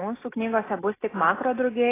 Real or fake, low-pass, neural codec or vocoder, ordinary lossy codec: real; 3.6 kHz; none; AAC, 16 kbps